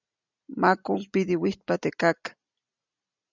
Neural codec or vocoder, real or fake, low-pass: none; real; 7.2 kHz